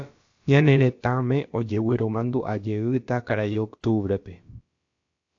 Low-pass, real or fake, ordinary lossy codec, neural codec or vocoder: 7.2 kHz; fake; AAC, 48 kbps; codec, 16 kHz, about 1 kbps, DyCAST, with the encoder's durations